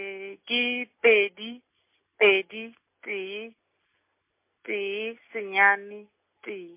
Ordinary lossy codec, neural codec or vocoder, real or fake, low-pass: MP3, 24 kbps; none; real; 3.6 kHz